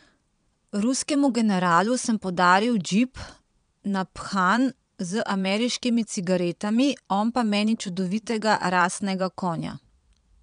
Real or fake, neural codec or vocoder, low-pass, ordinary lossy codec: fake; vocoder, 22.05 kHz, 80 mel bands, Vocos; 9.9 kHz; none